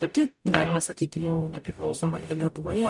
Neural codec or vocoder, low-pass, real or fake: codec, 44.1 kHz, 0.9 kbps, DAC; 10.8 kHz; fake